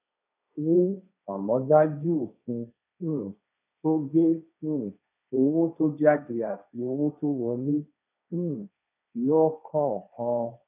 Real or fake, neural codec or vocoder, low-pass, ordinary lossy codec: fake; codec, 16 kHz, 1.1 kbps, Voila-Tokenizer; 3.6 kHz; none